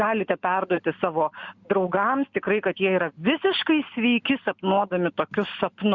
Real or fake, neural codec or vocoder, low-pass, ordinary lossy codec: real; none; 7.2 kHz; Opus, 64 kbps